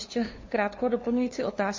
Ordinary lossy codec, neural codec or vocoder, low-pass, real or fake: MP3, 32 kbps; codec, 16 kHz, 2 kbps, FunCodec, trained on LibriTTS, 25 frames a second; 7.2 kHz; fake